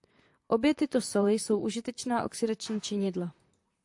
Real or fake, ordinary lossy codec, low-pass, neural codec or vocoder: fake; AAC, 64 kbps; 10.8 kHz; vocoder, 44.1 kHz, 128 mel bands, Pupu-Vocoder